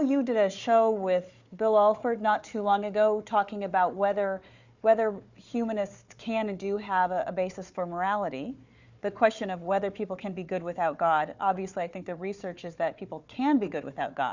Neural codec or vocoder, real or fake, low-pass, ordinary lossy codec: codec, 16 kHz, 4 kbps, FunCodec, trained on Chinese and English, 50 frames a second; fake; 7.2 kHz; Opus, 64 kbps